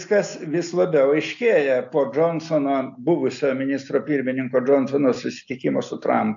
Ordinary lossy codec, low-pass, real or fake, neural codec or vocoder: AAC, 64 kbps; 7.2 kHz; real; none